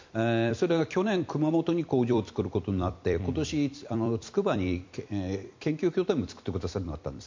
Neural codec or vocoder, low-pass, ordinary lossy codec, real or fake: vocoder, 44.1 kHz, 128 mel bands every 256 samples, BigVGAN v2; 7.2 kHz; MP3, 64 kbps; fake